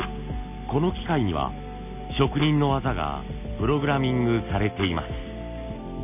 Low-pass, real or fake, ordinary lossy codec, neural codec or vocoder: 3.6 kHz; real; none; none